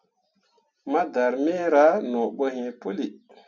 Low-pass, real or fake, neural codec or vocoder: 7.2 kHz; real; none